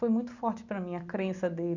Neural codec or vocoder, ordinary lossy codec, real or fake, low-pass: none; none; real; 7.2 kHz